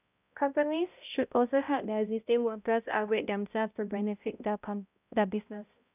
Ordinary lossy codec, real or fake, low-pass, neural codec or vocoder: none; fake; 3.6 kHz; codec, 16 kHz, 0.5 kbps, X-Codec, HuBERT features, trained on balanced general audio